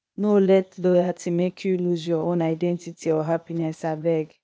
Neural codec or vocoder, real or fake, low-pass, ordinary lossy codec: codec, 16 kHz, 0.8 kbps, ZipCodec; fake; none; none